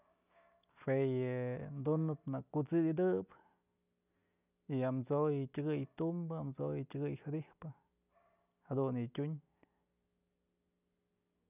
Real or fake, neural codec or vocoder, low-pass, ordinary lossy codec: real; none; 3.6 kHz; none